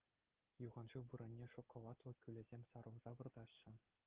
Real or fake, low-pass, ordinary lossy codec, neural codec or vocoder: real; 3.6 kHz; Opus, 16 kbps; none